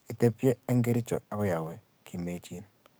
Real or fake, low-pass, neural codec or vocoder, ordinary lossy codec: fake; none; codec, 44.1 kHz, 7.8 kbps, DAC; none